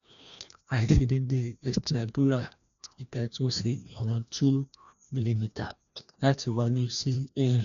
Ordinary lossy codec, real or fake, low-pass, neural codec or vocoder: none; fake; 7.2 kHz; codec, 16 kHz, 1 kbps, FreqCodec, larger model